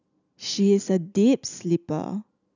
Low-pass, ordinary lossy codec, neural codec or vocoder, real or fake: 7.2 kHz; none; none; real